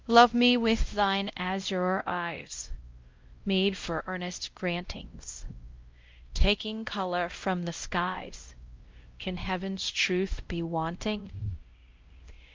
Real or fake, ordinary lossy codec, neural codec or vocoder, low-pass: fake; Opus, 24 kbps; codec, 16 kHz, 0.5 kbps, X-Codec, WavLM features, trained on Multilingual LibriSpeech; 7.2 kHz